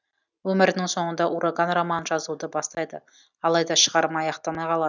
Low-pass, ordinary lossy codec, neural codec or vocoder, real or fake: 7.2 kHz; none; none; real